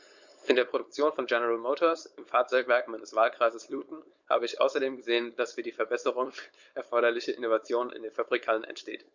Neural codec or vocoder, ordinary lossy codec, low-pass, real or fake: codec, 16 kHz, 4.8 kbps, FACodec; Opus, 64 kbps; 7.2 kHz; fake